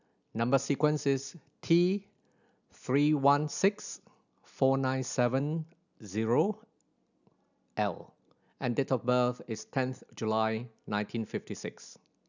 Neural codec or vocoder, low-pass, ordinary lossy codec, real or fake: none; 7.2 kHz; none; real